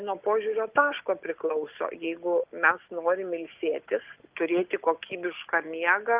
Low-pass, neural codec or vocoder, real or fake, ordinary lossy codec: 3.6 kHz; none; real; Opus, 24 kbps